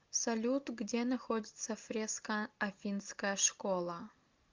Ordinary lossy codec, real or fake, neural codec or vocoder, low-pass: Opus, 24 kbps; real; none; 7.2 kHz